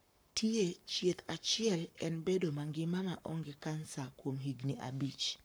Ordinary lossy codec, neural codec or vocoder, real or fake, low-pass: none; codec, 44.1 kHz, 7.8 kbps, Pupu-Codec; fake; none